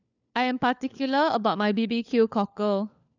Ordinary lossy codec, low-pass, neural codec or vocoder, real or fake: none; 7.2 kHz; codec, 16 kHz, 4 kbps, FunCodec, trained on LibriTTS, 50 frames a second; fake